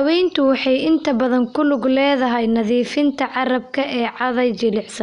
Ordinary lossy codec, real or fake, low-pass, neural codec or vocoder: none; real; 10.8 kHz; none